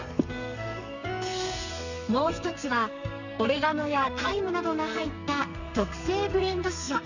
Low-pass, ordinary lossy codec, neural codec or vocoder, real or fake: 7.2 kHz; none; codec, 32 kHz, 1.9 kbps, SNAC; fake